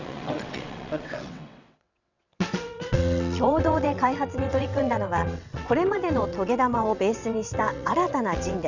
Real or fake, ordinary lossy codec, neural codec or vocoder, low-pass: fake; none; vocoder, 22.05 kHz, 80 mel bands, WaveNeXt; 7.2 kHz